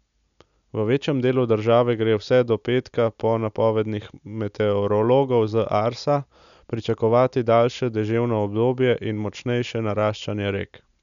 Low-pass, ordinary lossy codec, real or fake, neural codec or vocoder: 7.2 kHz; none; real; none